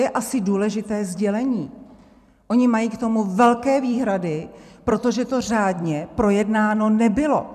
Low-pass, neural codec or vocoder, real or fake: 14.4 kHz; vocoder, 44.1 kHz, 128 mel bands every 256 samples, BigVGAN v2; fake